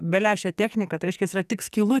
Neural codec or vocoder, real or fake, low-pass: codec, 44.1 kHz, 2.6 kbps, SNAC; fake; 14.4 kHz